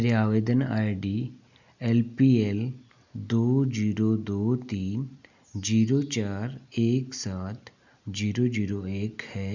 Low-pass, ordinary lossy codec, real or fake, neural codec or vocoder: 7.2 kHz; none; real; none